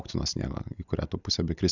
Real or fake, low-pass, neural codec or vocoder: real; 7.2 kHz; none